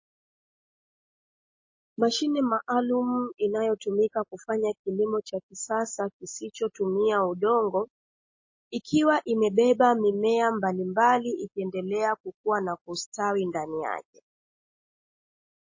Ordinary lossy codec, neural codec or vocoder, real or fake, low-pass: MP3, 32 kbps; none; real; 7.2 kHz